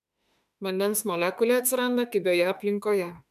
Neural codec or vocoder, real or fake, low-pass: autoencoder, 48 kHz, 32 numbers a frame, DAC-VAE, trained on Japanese speech; fake; 14.4 kHz